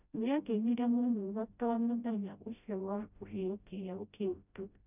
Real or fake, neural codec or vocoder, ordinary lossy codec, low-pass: fake; codec, 16 kHz, 0.5 kbps, FreqCodec, smaller model; none; 3.6 kHz